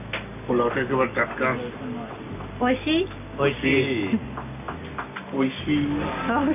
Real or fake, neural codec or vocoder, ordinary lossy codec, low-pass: real; none; none; 3.6 kHz